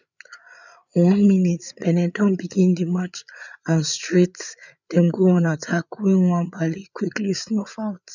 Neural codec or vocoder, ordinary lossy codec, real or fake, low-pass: codec, 16 kHz, 8 kbps, FreqCodec, larger model; none; fake; 7.2 kHz